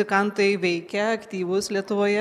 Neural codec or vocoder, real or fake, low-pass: none; real; 14.4 kHz